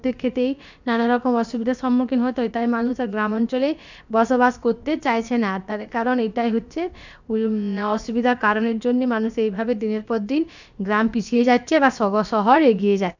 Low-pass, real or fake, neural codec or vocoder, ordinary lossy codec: 7.2 kHz; fake; codec, 16 kHz, about 1 kbps, DyCAST, with the encoder's durations; none